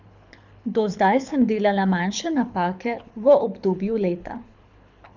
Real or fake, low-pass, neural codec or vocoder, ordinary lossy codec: fake; 7.2 kHz; codec, 24 kHz, 6 kbps, HILCodec; none